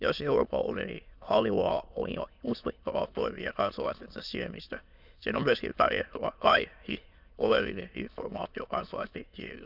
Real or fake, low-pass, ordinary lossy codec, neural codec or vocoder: fake; 5.4 kHz; none; autoencoder, 22.05 kHz, a latent of 192 numbers a frame, VITS, trained on many speakers